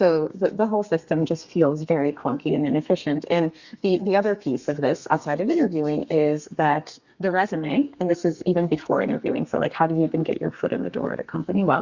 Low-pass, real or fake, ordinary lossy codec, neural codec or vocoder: 7.2 kHz; fake; Opus, 64 kbps; codec, 32 kHz, 1.9 kbps, SNAC